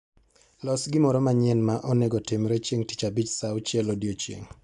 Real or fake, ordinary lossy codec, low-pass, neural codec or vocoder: real; MP3, 96 kbps; 10.8 kHz; none